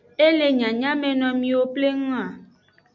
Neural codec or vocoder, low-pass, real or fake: none; 7.2 kHz; real